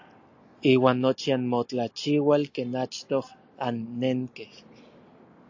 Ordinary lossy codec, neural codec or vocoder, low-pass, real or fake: AAC, 48 kbps; none; 7.2 kHz; real